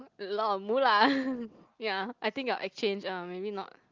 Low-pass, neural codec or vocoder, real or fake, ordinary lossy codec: 7.2 kHz; none; real; Opus, 24 kbps